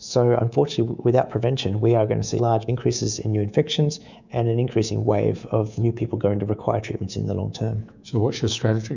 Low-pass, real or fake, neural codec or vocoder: 7.2 kHz; fake; codec, 24 kHz, 3.1 kbps, DualCodec